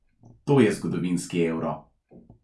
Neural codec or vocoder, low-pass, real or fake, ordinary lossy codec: none; none; real; none